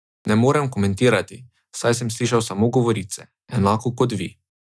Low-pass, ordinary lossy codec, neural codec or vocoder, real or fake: none; none; none; real